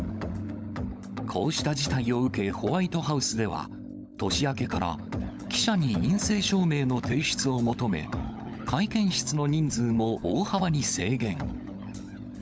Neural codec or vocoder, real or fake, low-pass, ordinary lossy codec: codec, 16 kHz, 16 kbps, FunCodec, trained on LibriTTS, 50 frames a second; fake; none; none